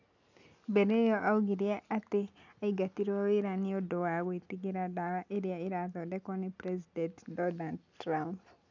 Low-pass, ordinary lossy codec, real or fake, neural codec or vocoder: 7.2 kHz; none; real; none